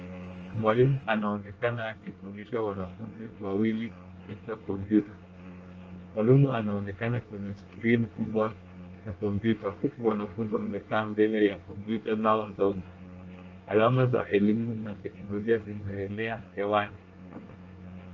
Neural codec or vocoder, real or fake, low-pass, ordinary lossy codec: codec, 24 kHz, 1 kbps, SNAC; fake; 7.2 kHz; Opus, 24 kbps